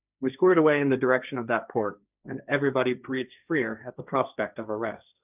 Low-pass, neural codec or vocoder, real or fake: 3.6 kHz; codec, 16 kHz, 1.1 kbps, Voila-Tokenizer; fake